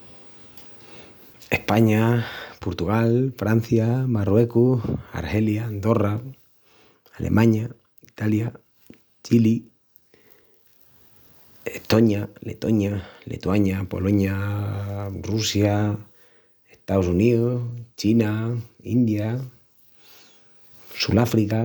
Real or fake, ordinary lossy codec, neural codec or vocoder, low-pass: real; none; none; none